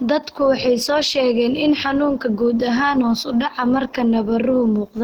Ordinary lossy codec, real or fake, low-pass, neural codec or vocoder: Opus, 16 kbps; real; 19.8 kHz; none